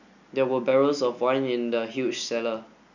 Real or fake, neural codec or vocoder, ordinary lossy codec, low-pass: real; none; none; 7.2 kHz